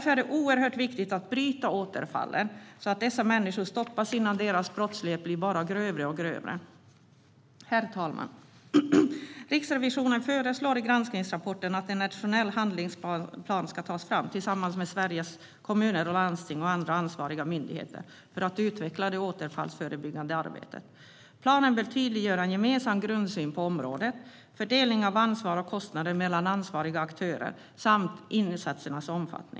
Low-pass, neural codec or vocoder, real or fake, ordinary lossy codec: none; none; real; none